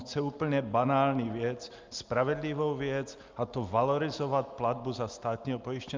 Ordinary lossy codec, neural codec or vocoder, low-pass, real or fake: Opus, 24 kbps; none; 7.2 kHz; real